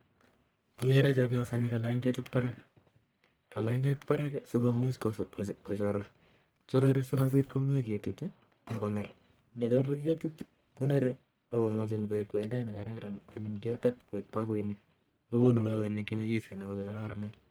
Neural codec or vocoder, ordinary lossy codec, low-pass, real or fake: codec, 44.1 kHz, 1.7 kbps, Pupu-Codec; none; none; fake